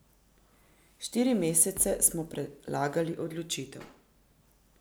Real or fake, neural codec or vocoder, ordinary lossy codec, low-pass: real; none; none; none